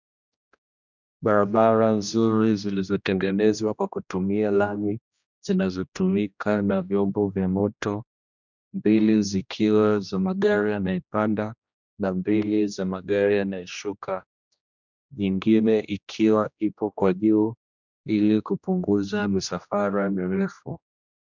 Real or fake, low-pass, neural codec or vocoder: fake; 7.2 kHz; codec, 16 kHz, 1 kbps, X-Codec, HuBERT features, trained on general audio